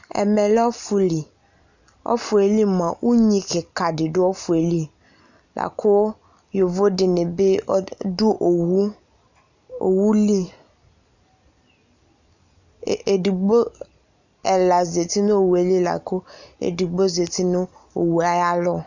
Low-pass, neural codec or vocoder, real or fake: 7.2 kHz; none; real